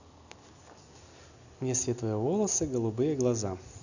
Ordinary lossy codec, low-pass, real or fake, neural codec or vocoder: none; 7.2 kHz; real; none